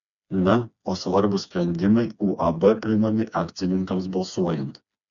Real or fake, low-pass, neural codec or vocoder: fake; 7.2 kHz; codec, 16 kHz, 2 kbps, FreqCodec, smaller model